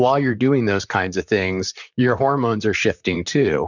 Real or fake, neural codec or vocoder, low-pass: fake; vocoder, 44.1 kHz, 128 mel bands, Pupu-Vocoder; 7.2 kHz